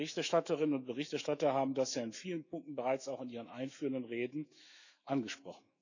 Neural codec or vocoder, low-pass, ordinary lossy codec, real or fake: autoencoder, 48 kHz, 128 numbers a frame, DAC-VAE, trained on Japanese speech; 7.2 kHz; none; fake